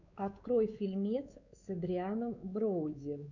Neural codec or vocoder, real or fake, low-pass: codec, 16 kHz, 4 kbps, X-Codec, WavLM features, trained on Multilingual LibriSpeech; fake; 7.2 kHz